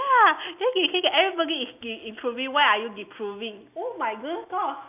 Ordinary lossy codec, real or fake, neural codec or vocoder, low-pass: AAC, 32 kbps; real; none; 3.6 kHz